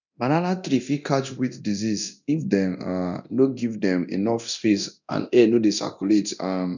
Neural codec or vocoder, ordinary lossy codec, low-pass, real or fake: codec, 24 kHz, 0.9 kbps, DualCodec; none; 7.2 kHz; fake